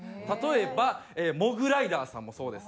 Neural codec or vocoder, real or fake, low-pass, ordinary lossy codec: none; real; none; none